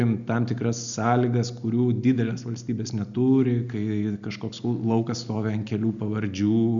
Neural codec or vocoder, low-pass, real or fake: none; 7.2 kHz; real